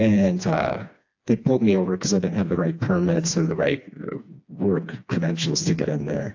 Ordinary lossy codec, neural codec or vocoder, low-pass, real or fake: AAC, 48 kbps; codec, 16 kHz, 2 kbps, FreqCodec, smaller model; 7.2 kHz; fake